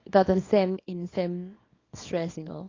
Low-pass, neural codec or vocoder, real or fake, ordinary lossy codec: 7.2 kHz; codec, 24 kHz, 0.9 kbps, WavTokenizer, small release; fake; AAC, 32 kbps